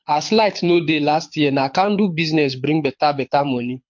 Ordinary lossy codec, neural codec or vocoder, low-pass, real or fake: MP3, 48 kbps; codec, 24 kHz, 6 kbps, HILCodec; 7.2 kHz; fake